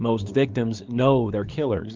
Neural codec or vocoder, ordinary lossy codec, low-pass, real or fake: codec, 16 kHz, 4 kbps, X-Codec, WavLM features, trained on Multilingual LibriSpeech; Opus, 32 kbps; 7.2 kHz; fake